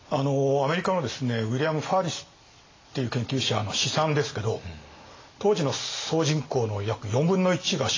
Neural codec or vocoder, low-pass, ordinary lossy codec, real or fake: none; 7.2 kHz; AAC, 32 kbps; real